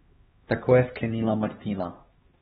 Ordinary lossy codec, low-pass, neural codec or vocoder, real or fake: AAC, 16 kbps; 7.2 kHz; codec, 16 kHz, 2 kbps, X-Codec, HuBERT features, trained on LibriSpeech; fake